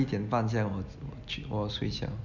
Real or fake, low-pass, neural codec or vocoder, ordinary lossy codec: real; 7.2 kHz; none; none